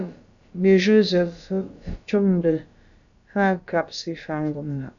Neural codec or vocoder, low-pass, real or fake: codec, 16 kHz, about 1 kbps, DyCAST, with the encoder's durations; 7.2 kHz; fake